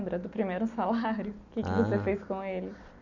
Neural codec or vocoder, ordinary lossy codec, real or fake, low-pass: none; none; real; 7.2 kHz